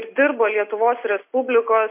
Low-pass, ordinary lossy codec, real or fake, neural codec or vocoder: 3.6 kHz; MP3, 24 kbps; real; none